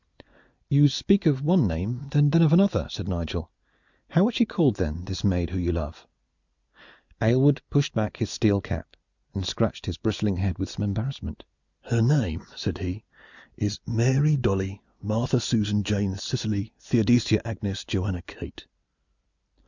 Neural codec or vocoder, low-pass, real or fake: none; 7.2 kHz; real